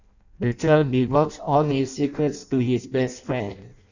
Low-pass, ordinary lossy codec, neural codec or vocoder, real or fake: 7.2 kHz; none; codec, 16 kHz in and 24 kHz out, 0.6 kbps, FireRedTTS-2 codec; fake